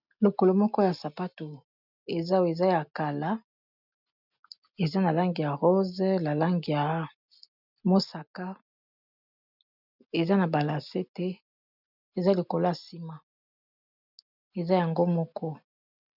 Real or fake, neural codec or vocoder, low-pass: real; none; 5.4 kHz